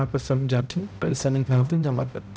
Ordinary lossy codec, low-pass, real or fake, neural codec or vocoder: none; none; fake; codec, 16 kHz, 0.5 kbps, X-Codec, HuBERT features, trained on balanced general audio